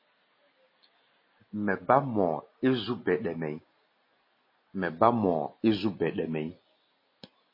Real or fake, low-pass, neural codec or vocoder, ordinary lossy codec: real; 5.4 kHz; none; MP3, 24 kbps